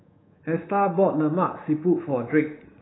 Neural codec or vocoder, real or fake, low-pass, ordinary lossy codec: codec, 24 kHz, 3.1 kbps, DualCodec; fake; 7.2 kHz; AAC, 16 kbps